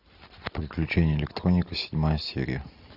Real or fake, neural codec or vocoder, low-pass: real; none; 5.4 kHz